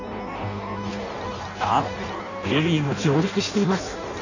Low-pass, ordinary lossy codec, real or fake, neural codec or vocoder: 7.2 kHz; none; fake; codec, 16 kHz in and 24 kHz out, 0.6 kbps, FireRedTTS-2 codec